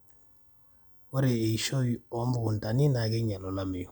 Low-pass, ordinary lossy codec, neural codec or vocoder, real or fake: none; none; none; real